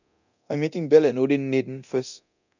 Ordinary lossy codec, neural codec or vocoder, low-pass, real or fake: none; codec, 24 kHz, 0.9 kbps, DualCodec; 7.2 kHz; fake